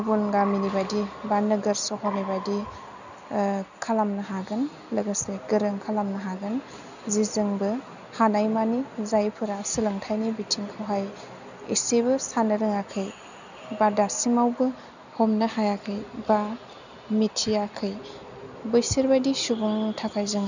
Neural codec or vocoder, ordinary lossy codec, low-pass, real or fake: none; none; 7.2 kHz; real